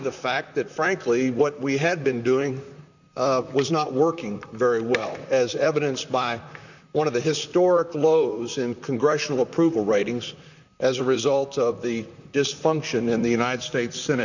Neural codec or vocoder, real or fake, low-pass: vocoder, 44.1 kHz, 128 mel bands, Pupu-Vocoder; fake; 7.2 kHz